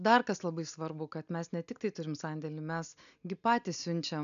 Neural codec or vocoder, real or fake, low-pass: none; real; 7.2 kHz